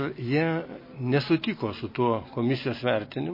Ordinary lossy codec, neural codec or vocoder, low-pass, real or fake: MP3, 24 kbps; none; 5.4 kHz; real